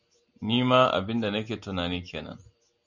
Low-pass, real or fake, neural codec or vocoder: 7.2 kHz; real; none